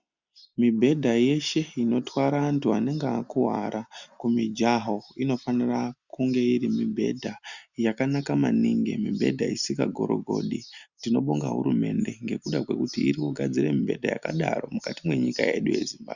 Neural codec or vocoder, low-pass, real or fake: none; 7.2 kHz; real